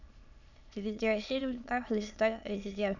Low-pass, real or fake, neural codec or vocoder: 7.2 kHz; fake; autoencoder, 22.05 kHz, a latent of 192 numbers a frame, VITS, trained on many speakers